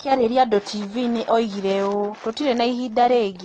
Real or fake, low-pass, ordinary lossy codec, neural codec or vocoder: real; 9.9 kHz; AAC, 32 kbps; none